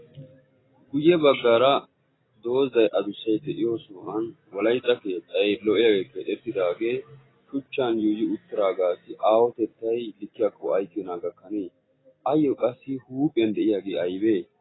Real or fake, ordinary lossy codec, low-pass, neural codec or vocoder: real; AAC, 16 kbps; 7.2 kHz; none